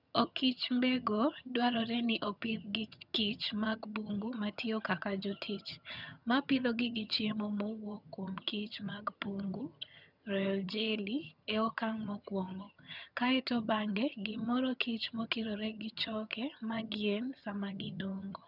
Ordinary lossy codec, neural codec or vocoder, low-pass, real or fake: none; vocoder, 22.05 kHz, 80 mel bands, HiFi-GAN; 5.4 kHz; fake